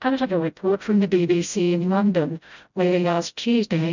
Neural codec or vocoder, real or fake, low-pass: codec, 16 kHz, 0.5 kbps, FreqCodec, smaller model; fake; 7.2 kHz